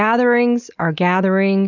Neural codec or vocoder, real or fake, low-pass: none; real; 7.2 kHz